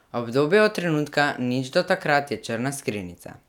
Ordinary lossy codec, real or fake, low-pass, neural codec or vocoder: none; real; 19.8 kHz; none